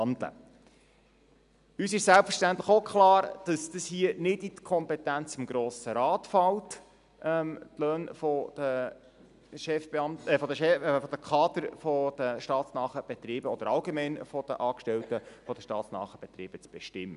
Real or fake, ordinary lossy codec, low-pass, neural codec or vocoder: real; none; 10.8 kHz; none